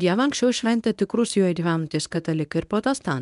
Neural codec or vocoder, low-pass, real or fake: codec, 24 kHz, 0.9 kbps, WavTokenizer, medium speech release version 1; 10.8 kHz; fake